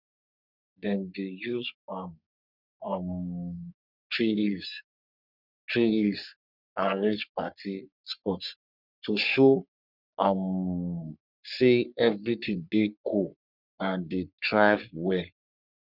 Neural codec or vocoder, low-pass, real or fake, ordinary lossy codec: codec, 44.1 kHz, 3.4 kbps, Pupu-Codec; 5.4 kHz; fake; none